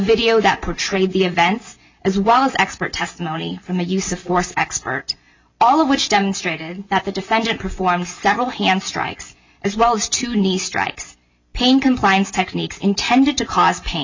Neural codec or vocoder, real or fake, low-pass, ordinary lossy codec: none; real; 7.2 kHz; MP3, 64 kbps